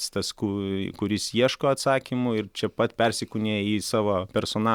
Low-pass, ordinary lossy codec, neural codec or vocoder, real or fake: 19.8 kHz; Opus, 64 kbps; none; real